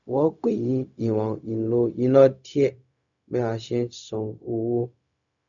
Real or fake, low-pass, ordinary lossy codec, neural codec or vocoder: fake; 7.2 kHz; none; codec, 16 kHz, 0.4 kbps, LongCat-Audio-Codec